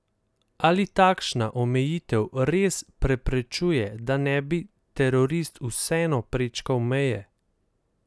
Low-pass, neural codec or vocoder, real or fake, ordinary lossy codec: none; none; real; none